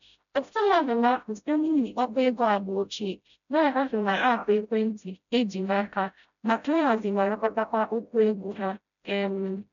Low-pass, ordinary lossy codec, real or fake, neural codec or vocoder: 7.2 kHz; none; fake; codec, 16 kHz, 0.5 kbps, FreqCodec, smaller model